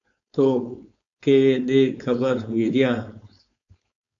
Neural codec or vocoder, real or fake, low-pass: codec, 16 kHz, 4.8 kbps, FACodec; fake; 7.2 kHz